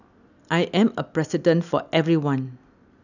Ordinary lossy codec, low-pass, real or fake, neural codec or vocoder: none; 7.2 kHz; real; none